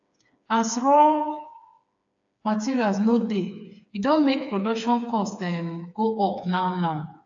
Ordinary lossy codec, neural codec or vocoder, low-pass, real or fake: AAC, 64 kbps; codec, 16 kHz, 4 kbps, FreqCodec, smaller model; 7.2 kHz; fake